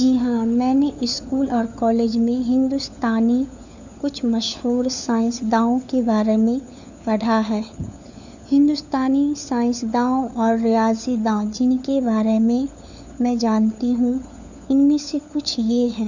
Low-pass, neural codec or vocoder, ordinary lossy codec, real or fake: 7.2 kHz; codec, 16 kHz, 4 kbps, FunCodec, trained on LibriTTS, 50 frames a second; none; fake